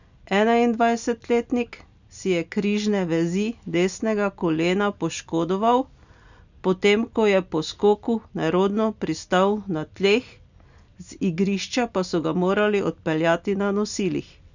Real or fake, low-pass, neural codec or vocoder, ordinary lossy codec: real; 7.2 kHz; none; none